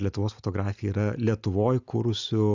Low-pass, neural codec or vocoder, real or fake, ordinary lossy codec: 7.2 kHz; none; real; Opus, 64 kbps